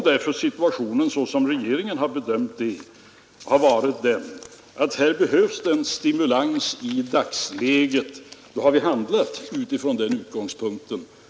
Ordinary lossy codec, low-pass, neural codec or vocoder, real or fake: none; none; none; real